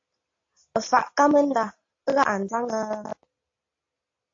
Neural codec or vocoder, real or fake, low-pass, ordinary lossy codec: none; real; 7.2 kHz; AAC, 48 kbps